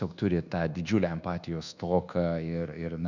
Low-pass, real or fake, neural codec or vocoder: 7.2 kHz; fake; codec, 24 kHz, 1.2 kbps, DualCodec